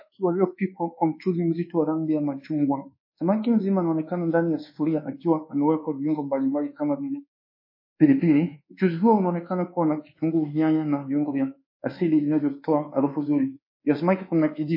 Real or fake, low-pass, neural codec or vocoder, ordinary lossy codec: fake; 5.4 kHz; codec, 24 kHz, 1.2 kbps, DualCodec; MP3, 24 kbps